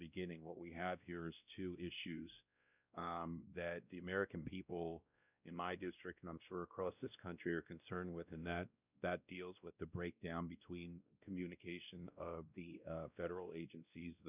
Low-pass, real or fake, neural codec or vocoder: 3.6 kHz; fake; codec, 16 kHz, 1 kbps, X-Codec, WavLM features, trained on Multilingual LibriSpeech